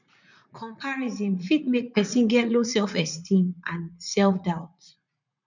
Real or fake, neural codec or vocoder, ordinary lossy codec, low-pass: fake; vocoder, 44.1 kHz, 80 mel bands, Vocos; none; 7.2 kHz